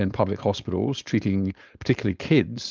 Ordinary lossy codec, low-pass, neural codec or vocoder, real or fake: Opus, 32 kbps; 7.2 kHz; codec, 16 kHz, 4.8 kbps, FACodec; fake